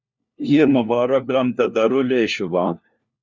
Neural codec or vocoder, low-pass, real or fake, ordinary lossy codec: codec, 16 kHz, 1 kbps, FunCodec, trained on LibriTTS, 50 frames a second; 7.2 kHz; fake; Opus, 64 kbps